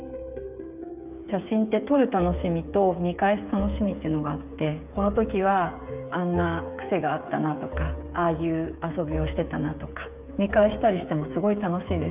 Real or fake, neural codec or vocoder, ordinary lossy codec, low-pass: fake; codec, 16 kHz, 8 kbps, FreqCodec, smaller model; none; 3.6 kHz